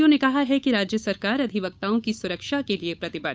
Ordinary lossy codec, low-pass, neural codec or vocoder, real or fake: none; none; codec, 16 kHz, 8 kbps, FunCodec, trained on Chinese and English, 25 frames a second; fake